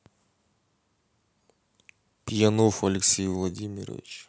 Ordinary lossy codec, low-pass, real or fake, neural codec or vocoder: none; none; real; none